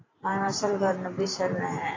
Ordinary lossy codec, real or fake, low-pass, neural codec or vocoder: AAC, 32 kbps; fake; 7.2 kHz; vocoder, 44.1 kHz, 128 mel bands every 512 samples, BigVGAN v2